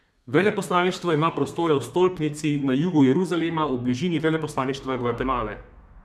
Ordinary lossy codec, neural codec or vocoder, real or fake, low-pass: none; codec, 32 kHz, 1.9 kbps, SNAC; fake; 14.4 kHz